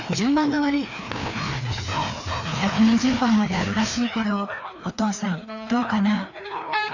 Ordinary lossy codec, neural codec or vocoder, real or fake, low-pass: none; codec, 16 kHz, 2 kbps, FreqCodec, larger model; fake; 7.2 kHz